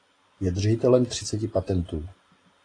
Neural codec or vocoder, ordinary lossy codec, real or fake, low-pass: none; AAC, 32 kbps; real; 9.9 kHz